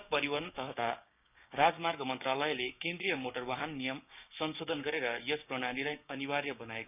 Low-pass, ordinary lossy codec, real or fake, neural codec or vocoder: 3.6 kHz; none; fake; codec, 16 kHz, 6 kbps, DAC